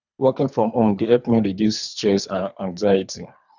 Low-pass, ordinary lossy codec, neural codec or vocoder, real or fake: 7.2 kHz; none; codec, 24 kHz, 3 kbps, HILCodec; fake